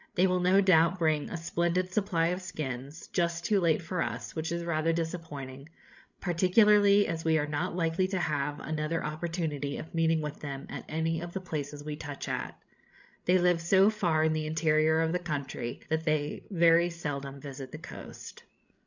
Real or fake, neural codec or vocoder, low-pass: fake; codec, 16 kHz, 16 kbps, FreqCodec, larger model; 7.2 kHz